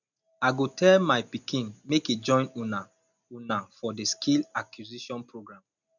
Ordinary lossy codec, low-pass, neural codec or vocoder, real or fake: none; 7.2 kHz; none; real